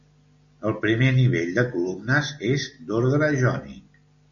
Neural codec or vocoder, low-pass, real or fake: none; 7.2 kHz; real